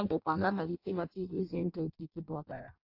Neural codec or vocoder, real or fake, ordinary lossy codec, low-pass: codec, 16 kHz in and 24 kHz out, 0.6 kbps, FireRedTTS-2 codec; fake; AAC, 48 kbps; 5.4 kHz